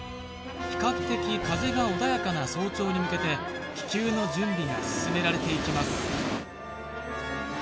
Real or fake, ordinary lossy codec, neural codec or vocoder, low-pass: real; none; none; none